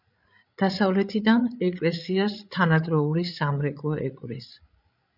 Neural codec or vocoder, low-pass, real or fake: codec, 16 kHz, 16 kbps, FreqCodec, larger model; 5.4 kHz; fake